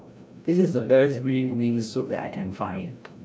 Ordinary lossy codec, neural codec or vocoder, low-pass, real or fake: none; codec, 16 kHz, 0.5 kbps, FreqCodec, larger model; none; fake